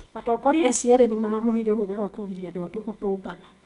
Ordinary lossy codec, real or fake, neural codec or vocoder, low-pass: none; fake; codec, 24 kHz, 0.9 kbps, WavTokenizer, medium music audio release; 10.8 kHz